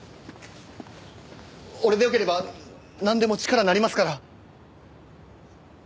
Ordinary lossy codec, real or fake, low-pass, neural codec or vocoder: none; real; none; none